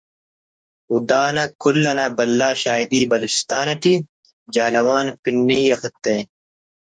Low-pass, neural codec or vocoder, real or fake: 9.9 kHz; codec, 44.1 kHz, 2.6 kbps, DAC; fake